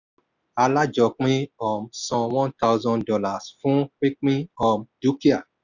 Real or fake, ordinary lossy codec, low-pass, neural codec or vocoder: real; none; 7.2 kHz; none